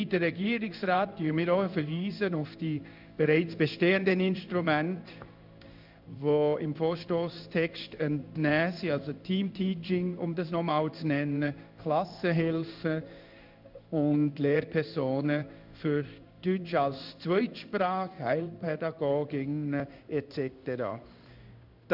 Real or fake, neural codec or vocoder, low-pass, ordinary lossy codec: fake; codec, 16 kHz in and 24 kHz out, 1 kbps, XY-Tokenizer; 5.4 kHz; none